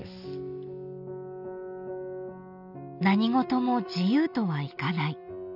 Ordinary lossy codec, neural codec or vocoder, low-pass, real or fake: none; none; 5.4 kHz; real